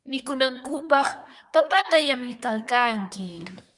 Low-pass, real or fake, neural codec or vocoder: 10.8 kHz; fake; codec, 24 kHz, 1 kbps, SNAC